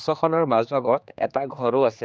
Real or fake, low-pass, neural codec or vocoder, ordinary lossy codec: fake; none; codec, 16 kHz, 2 kbps, X-Codec, HuBERT features, trained on general audio; none